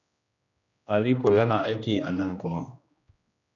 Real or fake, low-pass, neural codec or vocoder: fake; 7.2 kHz; codec, 16 kHz, 1 kbps, X-Codec, HuBERT features, trained on general audio